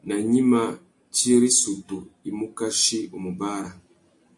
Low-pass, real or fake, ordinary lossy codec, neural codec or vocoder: 10.8 kHz; real; AAC, 64 kbps; none